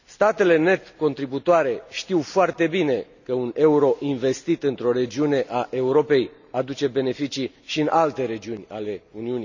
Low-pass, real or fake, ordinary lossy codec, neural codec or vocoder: 7.2 kHz; real; none; none